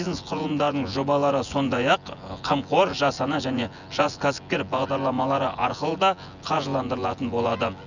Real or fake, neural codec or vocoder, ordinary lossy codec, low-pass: fake; vocoder, 24 kHz, 100 mel bands, Vocos; none; 7.2 kHz